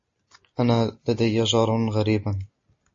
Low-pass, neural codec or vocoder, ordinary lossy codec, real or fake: 7.2 kHz; none; MP3, 32 kbps; real